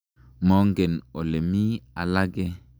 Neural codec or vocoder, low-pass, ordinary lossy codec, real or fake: none; none; none; real